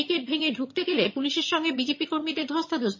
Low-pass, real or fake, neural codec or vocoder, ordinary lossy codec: 7.2 kHz; fake; vocoder, 22.05 kHz, 80 mel bands, HiFi-GAN; MP3, 32 kbps